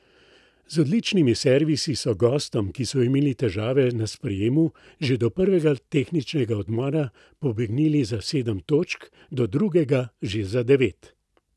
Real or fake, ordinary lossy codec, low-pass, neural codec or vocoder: real; none; none; none